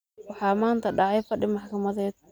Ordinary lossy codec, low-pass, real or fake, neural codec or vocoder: none; none; real; none